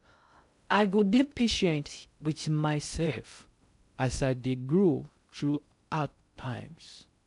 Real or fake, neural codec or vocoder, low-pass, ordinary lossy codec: fake; codec, 16 kHz in and 24 kHz out, 0.6 kbps, FocalCodec, streaming, 2048 codes; 10.8 kHz; none